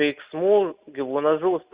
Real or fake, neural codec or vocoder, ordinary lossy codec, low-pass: real; none; Opus, 64 kbps; 3.6 kHz